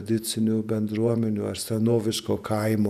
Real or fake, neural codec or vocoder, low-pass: fake; vocoder, 48 kHz, 128 mel bands, Vocos; 14.4 kHz